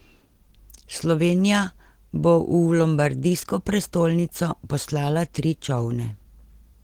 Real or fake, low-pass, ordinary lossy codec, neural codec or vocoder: real; 19.8 kHz; Opus, 16 kbps; none